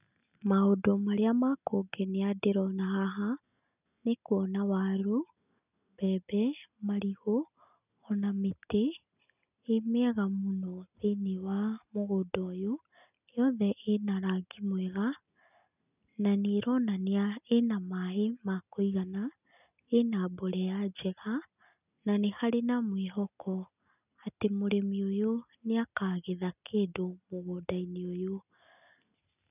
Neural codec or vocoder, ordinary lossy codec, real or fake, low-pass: none; none; real; 3.6 kHz